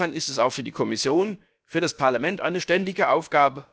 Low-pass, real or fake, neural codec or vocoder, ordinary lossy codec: none; fake; codec, 16 kHz, about 1 kbps, DyCAST, with the encoder's durations; none